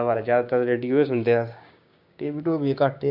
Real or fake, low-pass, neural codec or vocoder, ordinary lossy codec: fake; 5.4 kHz; codec, 16 kHz, 6 kbps, DAC; none